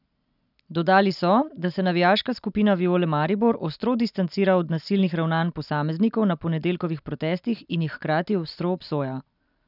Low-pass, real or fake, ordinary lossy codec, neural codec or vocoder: 5.4 kHz; real; none; none